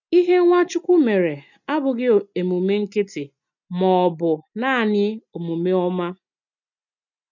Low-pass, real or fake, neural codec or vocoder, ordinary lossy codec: 7.2 kHz; real; none; none